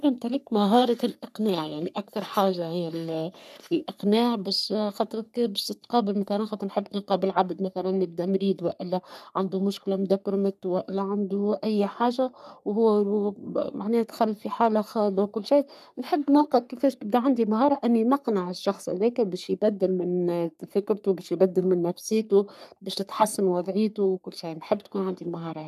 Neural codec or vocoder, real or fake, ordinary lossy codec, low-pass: codec, 44.1 kHz, 3.4 kbps, Pupu-Codec; fake; none; 14.4 kHz